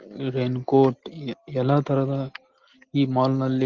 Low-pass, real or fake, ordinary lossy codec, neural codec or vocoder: 7.2 kHz; real; Opus, 16 kbps; none